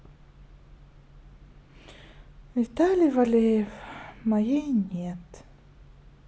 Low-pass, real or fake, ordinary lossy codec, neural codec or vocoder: none; real; none; none